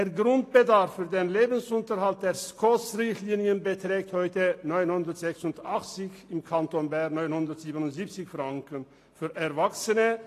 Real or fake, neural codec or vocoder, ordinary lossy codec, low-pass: real; none; AAC, 48 kbps; 14.4 kHz